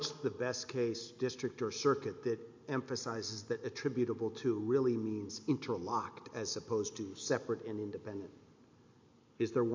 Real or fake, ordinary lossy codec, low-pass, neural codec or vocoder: real; MP3, 48 kbps; 7.2 kHz; none